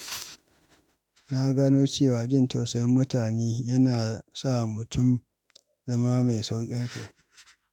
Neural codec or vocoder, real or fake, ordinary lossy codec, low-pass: autoencoder, 48 kHz, 32 numbers a frame, DAC-VAE, trained on Japanese speech; fake; none; 19.8 kHz